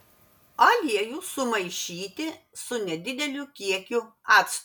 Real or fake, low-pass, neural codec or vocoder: real; 19.8 kHz; none